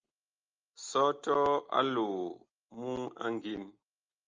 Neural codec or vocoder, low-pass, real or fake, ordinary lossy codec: none; 7.2 kHz; real; Opus, 24 kbps